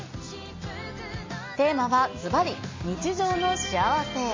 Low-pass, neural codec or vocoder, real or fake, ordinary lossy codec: 7.2 kHz; none; real; MP3, 32 kbps